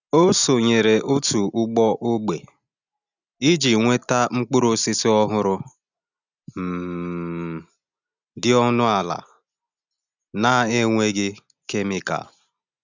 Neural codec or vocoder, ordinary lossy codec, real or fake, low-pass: none; none; real; 7.2 kHz